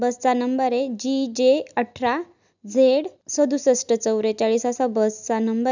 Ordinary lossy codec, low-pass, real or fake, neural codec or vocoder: none; 7.2 kHz; real; none